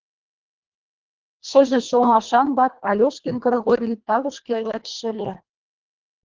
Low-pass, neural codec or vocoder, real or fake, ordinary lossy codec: 7.2 kHz; codec, 24 kHz, 1.5 kbps, HILCodec; fake; Opus, 32 kbps